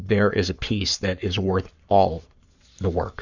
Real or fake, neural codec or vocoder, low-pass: fake; codec, 44.1 kHz, 7.8 kbps, Pupu-Codec; 7.2 kHz